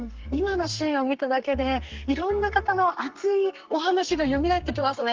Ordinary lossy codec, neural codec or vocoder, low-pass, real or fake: Opus, 24 kbps; codec, 32 kHz, 1.9 kbps, SNAC; 7.2 kHz; fake